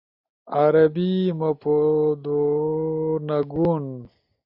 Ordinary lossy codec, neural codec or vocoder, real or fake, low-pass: AAC, 48 kbps; none; real; 5.4 kHz